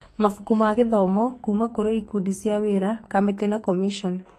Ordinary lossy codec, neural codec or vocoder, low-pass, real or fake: AAC, 48 kbps; codec, 44.1 kHz, 2.6 kbps, SNAC; 14.4 kHz; fake